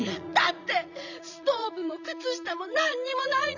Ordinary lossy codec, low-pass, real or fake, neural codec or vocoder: none; 7.2 kHz; fake; vocoder, 44.1 kHz, 80 mel bands, Vocos